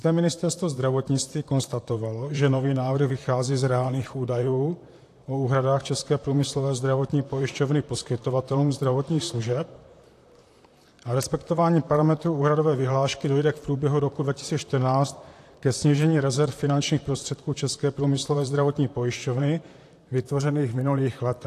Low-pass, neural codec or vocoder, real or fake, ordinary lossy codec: 14.4 kHz; vocoder, 44.1 kHz, 128 mel bands, Pupu-Vocoder; fake; AAC, 64 kbps